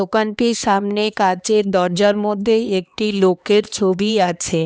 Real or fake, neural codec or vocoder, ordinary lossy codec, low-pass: fake; codec, 16 kHz, 2 kbps, X-Codec, HuBERT features, trained on LibriSpeech; none; none